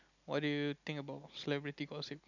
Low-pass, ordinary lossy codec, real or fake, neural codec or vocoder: 7.2 kHz; none; real; none